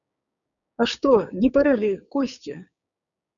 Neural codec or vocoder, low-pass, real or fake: codec, 16 kHz, 6 kbps, DAC; 7.2 kHz; fake